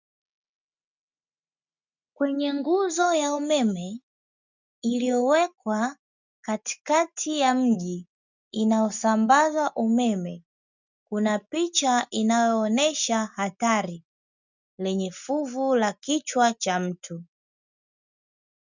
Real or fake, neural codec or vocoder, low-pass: real; none; 7.2 kHz